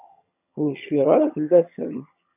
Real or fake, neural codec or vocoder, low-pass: fake; vocoder, 22.05 kHz, 80 mel bands, HiFi-GAN; 3.6 kHz